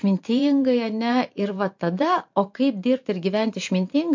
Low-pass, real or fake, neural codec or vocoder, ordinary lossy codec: 7.2 kHz; fake; vocoder, 44.1 kHz, 128 mel bands every 512 samples, BigVGAN v2; MP3, 48 kbps